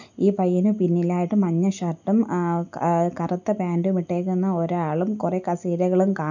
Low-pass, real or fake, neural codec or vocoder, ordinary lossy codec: 7.2 kHz; real; none; none